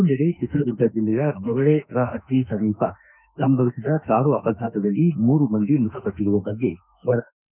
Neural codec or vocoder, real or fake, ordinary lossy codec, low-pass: autoencoder, 48 kHz, 32 numbers a frame, DAC-VAE, trained on Japanese speech; fake; none; 3.6 kHz